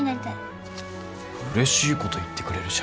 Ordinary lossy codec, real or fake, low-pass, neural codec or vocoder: none; real; none; none